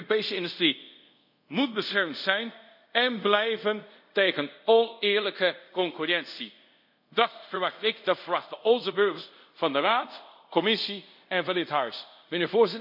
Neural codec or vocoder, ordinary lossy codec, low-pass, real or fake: codec, 24 kHz, 0.5 kbps, DualCodec; none; 5.4 kHz; fake